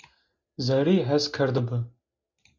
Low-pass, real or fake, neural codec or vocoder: 7.2 kHz; real; none